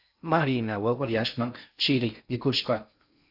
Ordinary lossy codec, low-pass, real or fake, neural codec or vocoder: AAC, 48 kbps; 5.4 kHz; fake; codec, 16 kHz in and 24 kHz out, 0.6 kbps, FocalCodec, streaming, 2048 codes